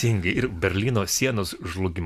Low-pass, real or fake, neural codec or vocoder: 14.4 kHz; real; none